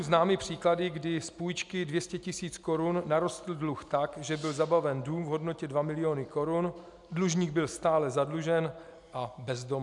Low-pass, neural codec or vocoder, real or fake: 10.8 kHz; none; real